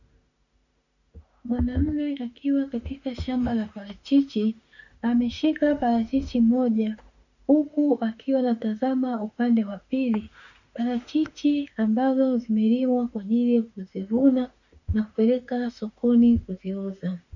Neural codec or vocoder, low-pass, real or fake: autoencoder, 48 kHz, 32 numbers a frame, DAC-VAE, trained on Japanese speech; 7.2 kHz; fake